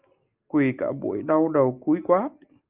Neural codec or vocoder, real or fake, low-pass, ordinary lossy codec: none; real; 3.6 kHz; Opus, 32 kbps